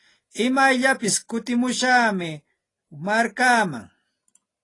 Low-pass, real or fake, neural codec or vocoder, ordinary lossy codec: 10.8 kHz; real; none; AAC, 32 kbps